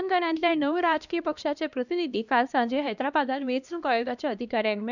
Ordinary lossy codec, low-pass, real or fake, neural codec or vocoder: none; 7.2 kHz; fake; codec, 16 kHz, 1 kbps, X-Codec, HuBERT features, trained on LibriSpeech